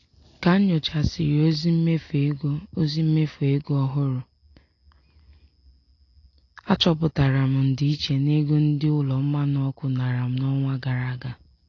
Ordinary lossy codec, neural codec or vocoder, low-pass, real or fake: AAC, 32 kbps; none; 7.2 kHz; real